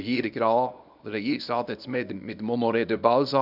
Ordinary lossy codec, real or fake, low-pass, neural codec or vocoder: none; fake; 5.4 kHz; codec, 24 kHz, 0.9 kbps, WavTokenizer, small release